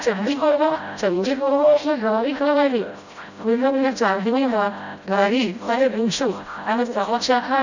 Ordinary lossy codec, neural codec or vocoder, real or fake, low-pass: none; codec, 16 kHz, 0.5 kbps, FreqCodec, smaller model; fake; 7.2 kHz